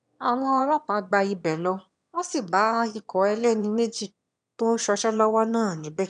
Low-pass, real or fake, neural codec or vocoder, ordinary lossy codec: 9.9 kHz; fake; autoencoder, 22.05 kHz, a latent of 192 numbers a frame, VITS, trained on one speaker; none